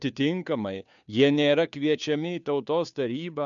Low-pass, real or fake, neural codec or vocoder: 7.2 kHz; fake; codec, 16 kHz, 2 kbps, FunCodec, trained on Chinese and English, 25 frames a second